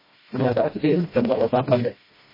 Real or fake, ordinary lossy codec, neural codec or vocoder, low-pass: fake; MP3, 24 kbps; codec, 16 kHz, 1 kbps, FreqCodec, smaller model; 5.4 kHz